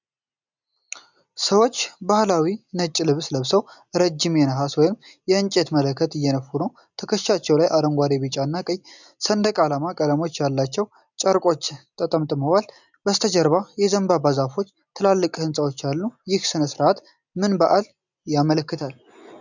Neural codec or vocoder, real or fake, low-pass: none; real; 7.2 kHz